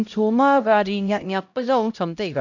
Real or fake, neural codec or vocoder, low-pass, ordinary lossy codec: fake; codec, 16 kHz, 0.5 kbps, X-Codec, HuBERT features, trained on LibriSpeech; 7.2 kHz; none